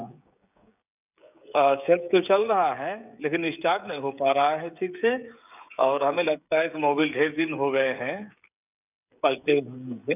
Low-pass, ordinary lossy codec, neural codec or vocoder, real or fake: 3.6 kHz; none; codec, 16 kHz, 16 kbps, FreqCodec, smaller model; fake